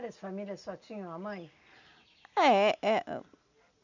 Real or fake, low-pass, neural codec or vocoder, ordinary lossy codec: real; 7.2 kHz; none; none